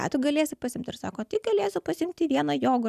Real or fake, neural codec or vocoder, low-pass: real; none; 14.4 kHz